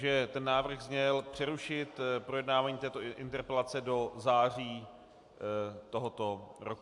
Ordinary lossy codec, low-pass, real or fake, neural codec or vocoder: AAC, 64 kbps; 10.8 kHz; real; none